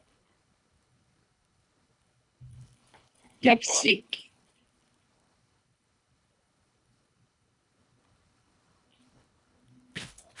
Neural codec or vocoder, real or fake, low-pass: codec, 24 kHz, 1.5 kbps, HILCodec; fake; 10.8 kHz